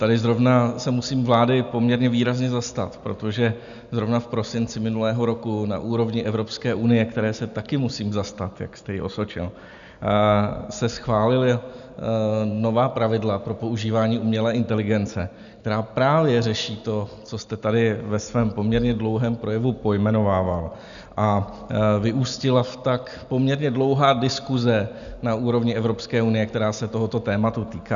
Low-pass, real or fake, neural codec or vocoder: 7.2 kHz; real; none